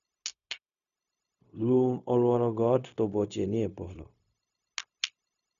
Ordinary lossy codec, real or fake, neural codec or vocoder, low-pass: none; fake; codec, 16 kHz, 0.4 kbps, LongCat-Audio-Codec; 7.2 kHz